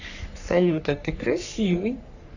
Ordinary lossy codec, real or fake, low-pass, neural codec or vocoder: none; fake; 7.2 kHz; codec, 44.1 kHz, 3.4 kbps, Pupu-Codec